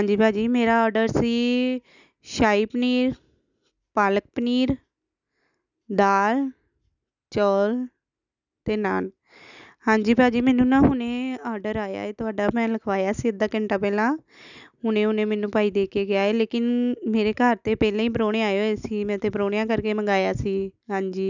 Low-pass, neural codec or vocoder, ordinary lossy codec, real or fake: 7.2 kHz; none; none; real